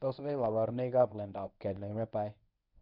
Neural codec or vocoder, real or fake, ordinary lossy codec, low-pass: codec, 24 kHz, 0.9 kbps, WavTokenizer, medium speech release version 1; fake; none; 5.4 kHz